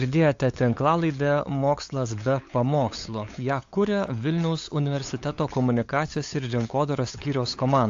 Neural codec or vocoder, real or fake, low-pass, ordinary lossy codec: codec, 16 kHz, 8 kbps, FunCodec, trained on LibriTTS, 25 frames a second; fake; 7.2 kHz; AAC, 48 kbps